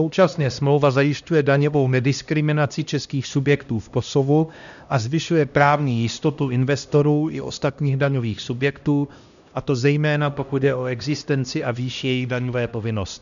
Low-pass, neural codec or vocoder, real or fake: 7.2 kHz; codec, 16 kHz, 1 kbps, X-Codec, HuBERT features, trained on LibriSpeech; fake